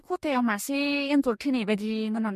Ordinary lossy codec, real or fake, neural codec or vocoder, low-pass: MP3, 64 kbps; fake; codec, 44.1 kHz, 2.6 kbps, DAC; 14.4 kHz